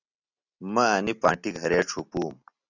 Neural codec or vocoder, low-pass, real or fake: none; 7.2 kHz; real